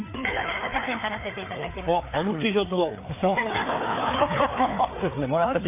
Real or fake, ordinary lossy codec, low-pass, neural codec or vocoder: fake; MP3, 32 kbps; 3.6 kHz; codec, 16 kHz, 4 kbps, FreqCodec, larger model